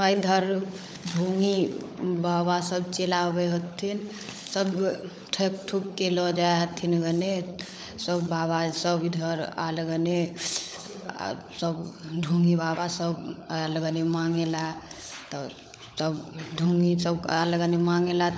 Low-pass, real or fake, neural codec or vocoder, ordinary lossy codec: none; fake; codec, 16 kHz, 16 kbps, FunCodec, trained on LibriTTS, 50 frames a second; none